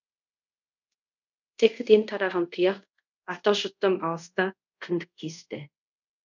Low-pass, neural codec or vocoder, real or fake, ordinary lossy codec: 7.2 kHz; codec, 24 kHz, 0.5 kbps, DualCodec; fake; none